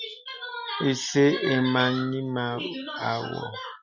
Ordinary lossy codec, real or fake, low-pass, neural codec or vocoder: Opus, 64 kbps; real; 7.2 kHz; none